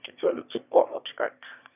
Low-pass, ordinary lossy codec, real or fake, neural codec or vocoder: 3.6 kHz; none; fake; autoencoder, 22.05 kHz, a latent of 192 numbers a frame, VITS, trained on one speaker